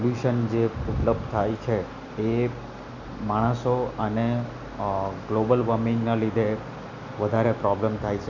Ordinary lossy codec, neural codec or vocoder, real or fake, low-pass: none; none; real; 7.2 kHz